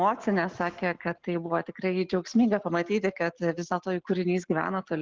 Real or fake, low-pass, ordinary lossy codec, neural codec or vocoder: real; 7.2 kHz; Opus, 16 kbps; none